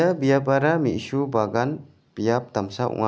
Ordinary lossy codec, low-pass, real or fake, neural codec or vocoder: none; none; real; none